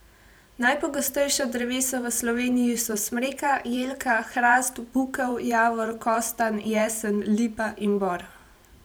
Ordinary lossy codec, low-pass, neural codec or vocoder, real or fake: none; none; vocoder, 44.1 kHz, 128 mel bands every 512 samples, BigVGAN v2; fake